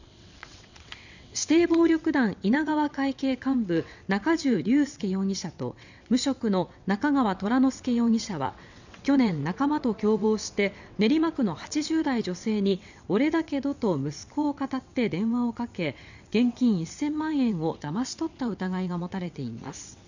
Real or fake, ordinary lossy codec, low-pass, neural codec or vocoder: fake; none; 7.2 kHz; vocoder, 22.05 kHz, 80 mel bands, WaveNeXt